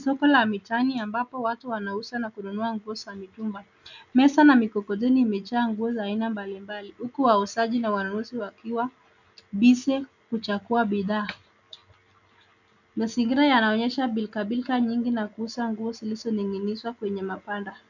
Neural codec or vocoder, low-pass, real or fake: none; 7.2 kHz; real